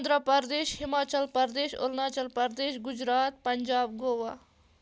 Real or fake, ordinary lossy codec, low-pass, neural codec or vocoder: real; none; none; none